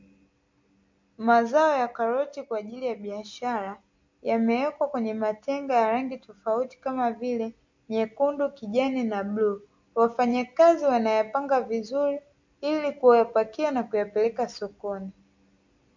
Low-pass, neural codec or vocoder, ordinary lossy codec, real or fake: 7.2 kHz; none; MP3, 48 kbps; real